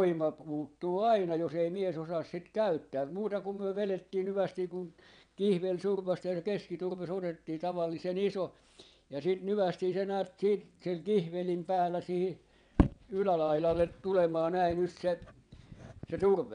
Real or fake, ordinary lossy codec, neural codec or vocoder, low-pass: fake; MP3, 96 kbps; vocoder, 22.05 kHz, 80 mel bands, WaveNeXt; 9.9 kHz